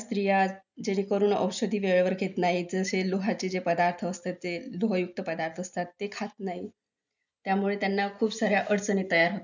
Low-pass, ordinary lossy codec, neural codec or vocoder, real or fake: 7.2 kHz; none; none; real